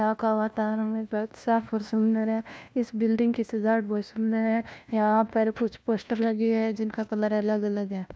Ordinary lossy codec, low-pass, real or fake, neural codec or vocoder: none; none; fake; codec, 16 kHz, 1 kbps, FunCodec, trained on LibriTTS, 50 frames a second